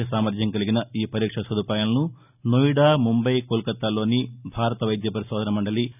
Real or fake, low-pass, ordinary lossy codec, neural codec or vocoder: real; 3.6 kHz; none; none